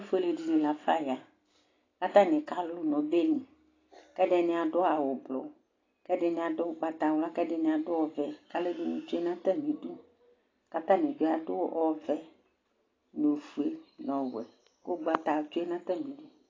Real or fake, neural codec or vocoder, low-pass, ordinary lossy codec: real; none; 7.2 kHz; AAC, 32 kbps